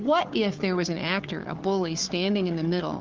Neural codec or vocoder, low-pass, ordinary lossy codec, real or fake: codec, 16 kHz, 4 kbps, FunCodec, trained on Chinese and English, 50 frames a second; 7.2 kHz; Opus, 32 kbps; fake